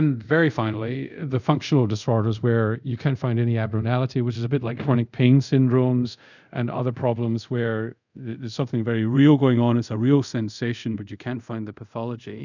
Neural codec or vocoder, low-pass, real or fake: codec, 24 kHz, 0.5 kbps, DualCodec; 7.2 kHz; fake